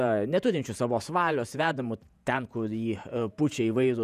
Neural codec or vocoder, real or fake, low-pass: vocoder, 48 kHz, 128 mel bands, Vocos; fake; 14.4 kHz